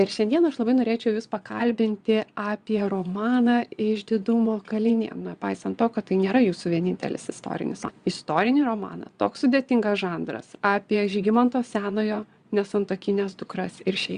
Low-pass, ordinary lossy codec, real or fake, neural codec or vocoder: 9.9 kHz; Opus, 64 kbps; fake; vocoder, 22.05 kHz, 80 mel bands, Vocos